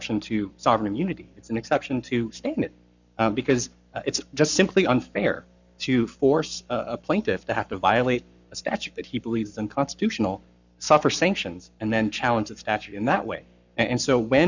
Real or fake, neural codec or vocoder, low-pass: fake; codec, 44.1 kHz, 7.8 kbps, Pupu-Codec; 7.2 kHz